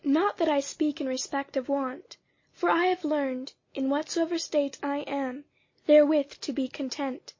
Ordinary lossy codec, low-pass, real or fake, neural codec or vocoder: MP3, 32 kbps; 7.2 kHz; real; none